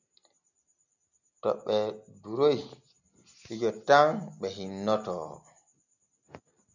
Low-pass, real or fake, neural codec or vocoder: 7.2 kHz; real; none